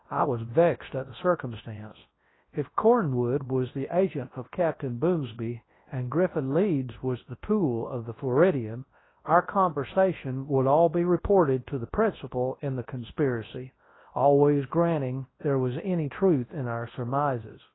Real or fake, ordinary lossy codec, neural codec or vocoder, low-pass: fake; AAC, 16 kbps; codec, 24 kHz, 0.9 kbps, WavTokenizer, large speech release; 7.2 kHz